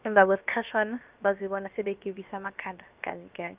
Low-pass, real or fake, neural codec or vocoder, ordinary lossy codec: 3.6 kHz; fake; codec, 16 kHz, about 1 kbps, DyCAST, with the encoder's durations; Opus, 24 kbps